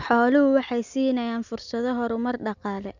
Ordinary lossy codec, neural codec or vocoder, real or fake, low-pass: none; none; real; 7.2 kHz